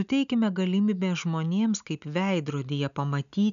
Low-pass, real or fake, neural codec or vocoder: 7.2 kHz; real; none